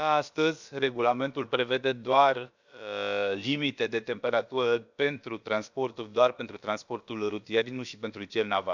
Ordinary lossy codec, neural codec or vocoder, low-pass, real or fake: none; codec, 16 kHz, about 1 kbps, DyCAST, with the encoder's durations; 7.2 kHz; fake